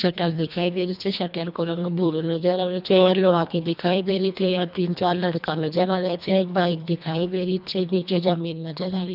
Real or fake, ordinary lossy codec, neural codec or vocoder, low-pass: fake; none; codec, 24 kHz, 1.5 kbps, HILCodec; 5.4 kHz